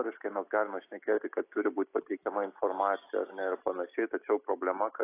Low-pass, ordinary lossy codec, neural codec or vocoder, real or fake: 3.6 kHz; AAC, 24 kbps; none; real